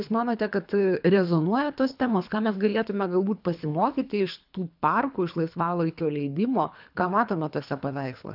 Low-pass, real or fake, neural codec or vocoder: 5.4 kHz; fake; codec, 24 kHz, 3 kbps, HILCodec